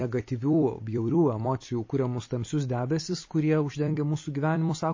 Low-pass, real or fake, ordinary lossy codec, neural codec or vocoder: 7.2 kHz; fake; MP3, 32 kbps; vocoder, 44.1 kHz, 128 mel bands every 256 samples, BigVGAN v2